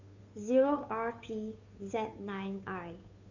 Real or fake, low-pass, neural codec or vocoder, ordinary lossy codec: fake; 7.2 kHz; codec, 16 kHz, 2 kbps, FunCodec, trained on Chinese and English, 25 frames a second; none